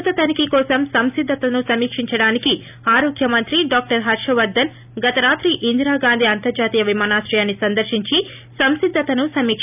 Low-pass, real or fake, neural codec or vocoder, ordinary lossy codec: 3.6 kHz; real; none; none